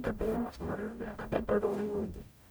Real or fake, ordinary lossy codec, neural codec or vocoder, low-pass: fake; none; codec, 44.1 kHz, 0.9 kbps, DAC; none